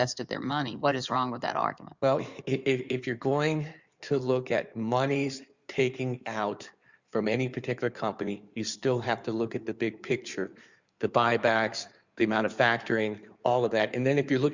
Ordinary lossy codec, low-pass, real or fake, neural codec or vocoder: Opus, 64 kbps; 7.2 kHz; fake; codec, 16 kHz in and 24 kHz out, 2.2 kbps, FireRedTTS-2 codec